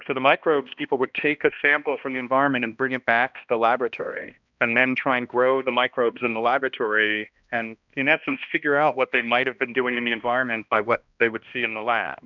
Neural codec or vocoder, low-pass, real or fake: codec, 16 kHz, 1 kbps, X-Codec, HuBERT features, trained on balanced general audio; 7.2 kHz; fake